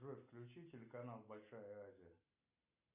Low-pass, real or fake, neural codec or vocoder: 3.6 kHz; real; none